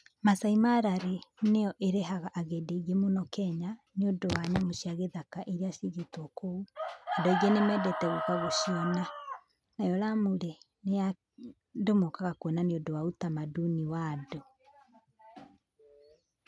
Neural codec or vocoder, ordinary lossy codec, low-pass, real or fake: none; none; none; real